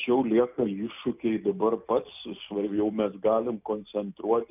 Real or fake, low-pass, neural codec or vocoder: real; 3.6 kHz; none